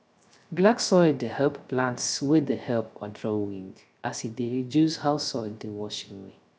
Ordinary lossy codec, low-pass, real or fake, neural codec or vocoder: none; none; fake; codec, 16 kHz, 0.3 kbps, FocalCodec